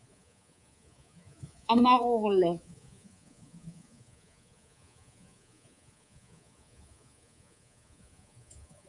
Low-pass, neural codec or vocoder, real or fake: 10.8 kHz; codec, 24 kHz, 3.1 kbps, DualCodec; fake